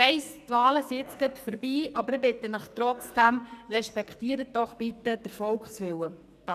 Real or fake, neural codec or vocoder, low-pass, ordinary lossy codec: fake; codec, 44.1 kHz, 2.6 kbps, SNAC; 14.4 kHz; none